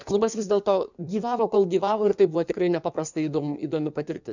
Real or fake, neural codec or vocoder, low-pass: fake; codec, 16 kHz in and 24 kHz out, 1.1 kbps, FireRedTTS-2 codec; 7.2 kHz